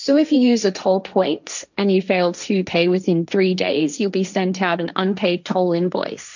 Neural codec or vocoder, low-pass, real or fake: codec, 16 kHz, 1.1 kbps, Voila-Tokenizer; 7.2 kHz; fake